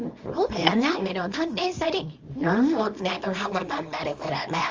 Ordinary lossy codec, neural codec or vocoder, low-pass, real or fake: Opus, 32 kbps; codec, 24 kHz, 0.9 kbps, WavTokenizer, small release; 7.2 kHz; fake